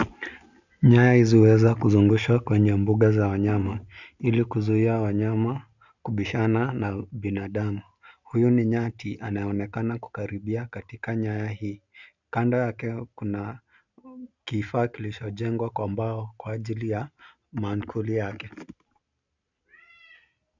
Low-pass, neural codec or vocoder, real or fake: 7.2 kHz; none; real